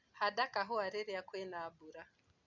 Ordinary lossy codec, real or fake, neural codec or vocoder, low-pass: none; real; none; 7.2 kHz